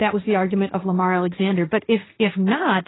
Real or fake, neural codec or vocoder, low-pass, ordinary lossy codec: fake; codec, 16 kHz, 1.1 kbps, Voila-Tokenizer; 7.2 kHz; AAC, 16 kbps